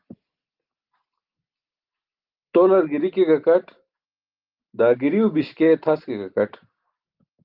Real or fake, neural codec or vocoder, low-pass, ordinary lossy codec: real; none; 5.4 kHz; Opus, 32 kbps